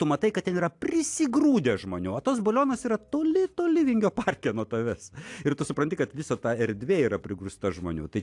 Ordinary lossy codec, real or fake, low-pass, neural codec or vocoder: AAC, 64 kbps; real; 10.8 kHz; none